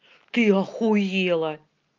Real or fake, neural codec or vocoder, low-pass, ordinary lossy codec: real; none; 7.2 kHz; Opus, 32 kbps